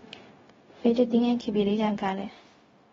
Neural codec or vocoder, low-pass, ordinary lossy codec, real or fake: codec, 16 kHz, 0.4 kbps, LongCat-Audio-Codec; 7.2 kHz; AAC, 24 kbps; fake